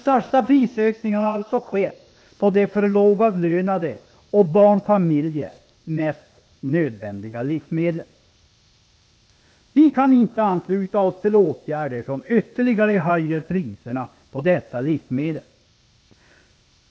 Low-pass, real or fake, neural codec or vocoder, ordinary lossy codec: none; fake; codec, 16 kHz, 0.8 kbps, ZipCodec; none